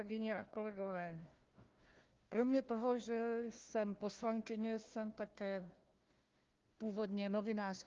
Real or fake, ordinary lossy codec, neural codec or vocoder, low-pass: fake; Opus, 32 kbps; codec, 16 kHz, 1 kbps, FunCodec, trained on Chinese and English, 50 frames a second; 7.2 kHz